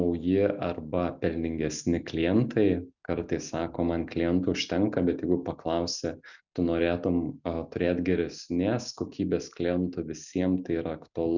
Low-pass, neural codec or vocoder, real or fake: 7.2 kHz; none; real